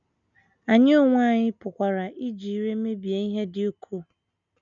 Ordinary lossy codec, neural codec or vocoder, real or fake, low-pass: none; none; real; 7.2 kHz